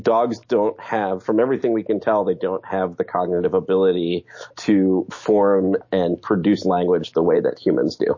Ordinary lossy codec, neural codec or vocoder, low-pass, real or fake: MP3, 32 kbps; autoencoder, 48 kHz, 128 numbers a frame, DAC-VAE, trained on Japanese speech; 7.2 kHz; fake